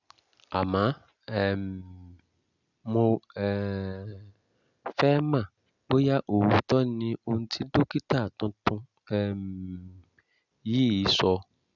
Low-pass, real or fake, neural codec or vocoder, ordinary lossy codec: 7.2 kHz; real; none; none